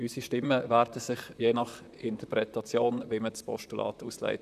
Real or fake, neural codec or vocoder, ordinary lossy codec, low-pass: fake; vocoder, 44.1 kHz, 128 mel bands, Pupu-Vocoder; none; 14.4 kHz